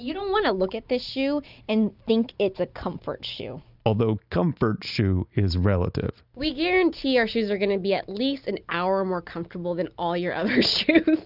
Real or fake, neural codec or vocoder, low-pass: real; none; 5.4 kHz